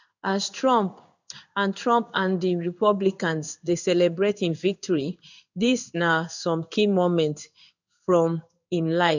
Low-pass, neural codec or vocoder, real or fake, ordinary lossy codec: 7.2 kHz; codec, 16 kHz in and 24 kHz out, 1 kbps, XY-Tokenizer; fake; none